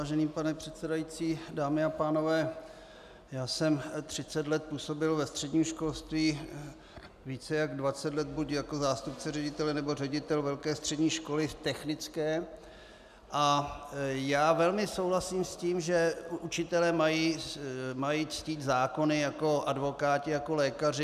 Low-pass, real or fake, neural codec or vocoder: 14.4 kHz; real; none